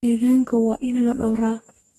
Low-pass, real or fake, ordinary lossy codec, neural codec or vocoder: 19.8 kHz; fake; AAC, 32 kbps; codec, 44.1 kHz, 2.6 kbps, DAC